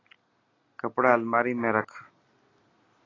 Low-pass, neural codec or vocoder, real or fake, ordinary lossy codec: 7.2 kHz; none; real; AAC, 32 kbps